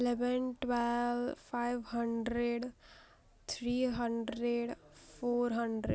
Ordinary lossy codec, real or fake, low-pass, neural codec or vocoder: none; real; none; none